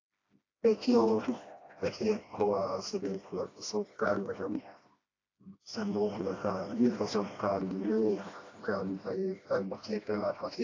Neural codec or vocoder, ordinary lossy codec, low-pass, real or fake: codec, 16 kHz, 1 kbps, FreqCodec, smaller model; AAC, 32 kbps; 7.2 kHz; fake